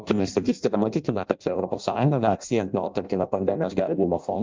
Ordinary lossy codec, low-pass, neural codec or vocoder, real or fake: Opus, 24 kbps; 7.2 kHz; codec, 16 kHz in and 24 kHz out, 0.6 kbps, FireRedTTS-2 codec; fake